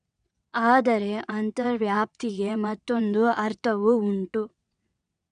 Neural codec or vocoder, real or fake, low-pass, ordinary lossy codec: vocoder, 22.05 kHz, 80 mel bands, WaveNeXt; fake; 9.9 kHz; none